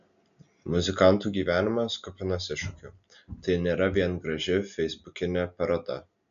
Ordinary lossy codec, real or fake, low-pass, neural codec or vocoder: MP3, 96 kbps; real; 7.2 kHz; none